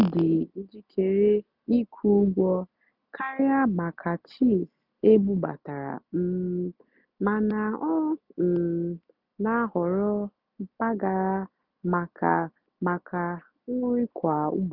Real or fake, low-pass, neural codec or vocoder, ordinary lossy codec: real; 5.4 kHz; none; none